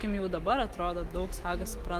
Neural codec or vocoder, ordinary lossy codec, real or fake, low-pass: autoencoder, 48 kHz, 128 numbers a frame, DAC-VAE, trained on Japanese speech; Opus, 24 kbps; fake; 14.4 kHz